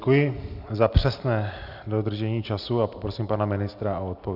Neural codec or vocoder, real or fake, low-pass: none; real; 5.4 kHz